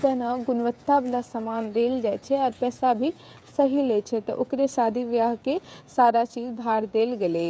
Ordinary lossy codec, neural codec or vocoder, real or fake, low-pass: none; codec, 16 kHz, 16 kbps, FreqCodec, smaller model; fake; none